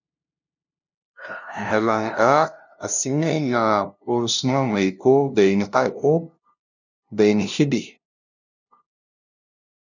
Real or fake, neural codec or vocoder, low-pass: fake; codec, 16 kHz, 0.5 kbps, FunCodec, trained on LibriTTS, 25 frames a second; 7.2 kHz